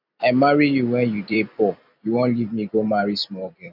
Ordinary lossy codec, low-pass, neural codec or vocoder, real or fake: none; 5.4 kHz; vocoder, 44.1 kHz, 128 mel bands every 256 samples, BigVGAN v2; fake